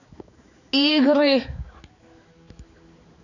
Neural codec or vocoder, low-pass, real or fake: codec, 16 kHz, 4 kbps, X-Codec, HuBERT features, trained on balanced general audio; 7.2 kHz; fake